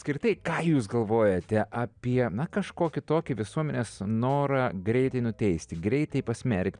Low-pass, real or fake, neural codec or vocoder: 9.9 kHz; real; none